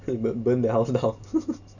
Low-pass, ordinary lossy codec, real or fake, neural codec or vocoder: 7.2 kHz; none; real; none